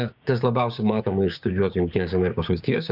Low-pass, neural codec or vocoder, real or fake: 5.4 kHz; codec, 16 kHz, 6 kbps, DAC; fake